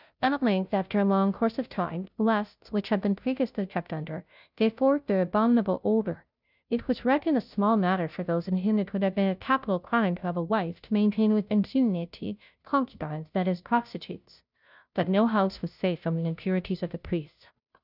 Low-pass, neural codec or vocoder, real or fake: 5.4 kHz; codec, 16 kHz, 0.5 kbps, FunCodec, trained on Chinese and English, 25 frames a second; fake